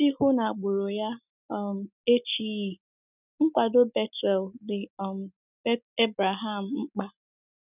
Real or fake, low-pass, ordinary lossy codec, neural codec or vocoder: real; 3.6 kHz; none; none